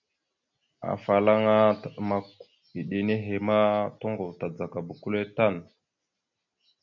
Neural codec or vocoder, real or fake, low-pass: none; real; 7.2 kHz